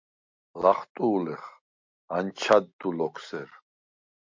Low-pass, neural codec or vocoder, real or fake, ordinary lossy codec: 7.2 kHz; none; real; MP3, 48 kbps